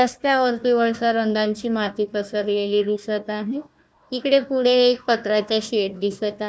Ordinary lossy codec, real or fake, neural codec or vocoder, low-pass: none; fake; codec, 16 kHz, 1 kbps, FunCodec, trained on Chinese and English, 50 frames a second; none